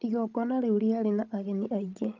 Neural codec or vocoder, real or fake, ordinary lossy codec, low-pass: codec, 16 kHz, 16 kbps, FreqCodec, larger model; fake; Opus, 24 kbps; 7.2 kHz